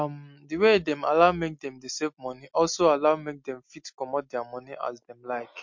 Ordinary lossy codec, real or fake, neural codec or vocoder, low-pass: MP3, 48 kbps; real; none; 7.2 kHz